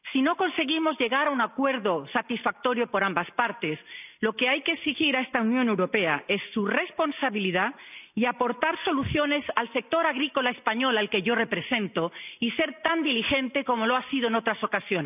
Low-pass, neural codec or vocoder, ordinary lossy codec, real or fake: 3.6 kHz; none; none; real